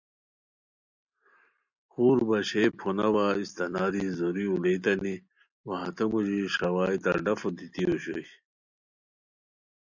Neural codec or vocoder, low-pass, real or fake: none; 7.2 kHz; real